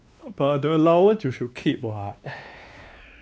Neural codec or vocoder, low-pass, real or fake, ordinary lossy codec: codec, 16 kHz, 2 kbps, X-Codec, WavLM features, trained on Multilingual LibriSpeech; none; fake; none